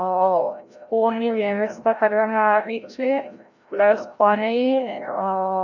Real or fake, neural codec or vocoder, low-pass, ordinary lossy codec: fake; codec, 16 kHz, 0.5 kbps, FreqCodec, larger model; 7.2 kHz; none